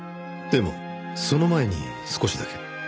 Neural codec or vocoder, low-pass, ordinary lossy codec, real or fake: none; none; none; real